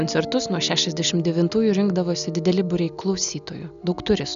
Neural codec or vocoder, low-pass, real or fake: none; 7.2 kHz; real